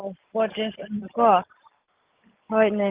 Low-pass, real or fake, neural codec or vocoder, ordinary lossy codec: 3.6 kHz; real; none; Opus, 24 kbps